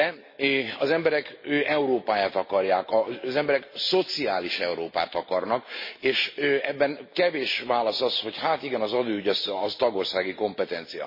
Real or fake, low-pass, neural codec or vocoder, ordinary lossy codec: real; 5.4 kHz; none; MP3, 24 kbps